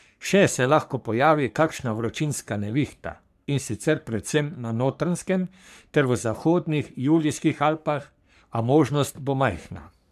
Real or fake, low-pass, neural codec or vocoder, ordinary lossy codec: fake; 14.4 kHz; codec, 44.1 kHz, 3.4 kbps, Pupu-Codec; none